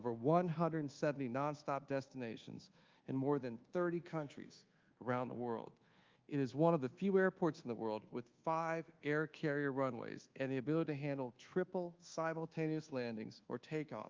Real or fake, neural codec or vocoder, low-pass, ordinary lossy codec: fake; codec, 24 kHz, 1.2 kbps, DualCodec; 7.2 kHz; Opus, 32 kbps